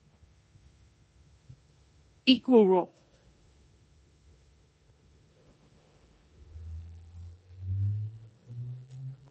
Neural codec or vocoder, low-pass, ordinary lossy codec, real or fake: codec, 16 kHz in and 24 kHz out, 0.9 kbps, LongCat-Audio-Codec, four codebook decoder; 10.8 kHz; MP3, 32 kbps; fake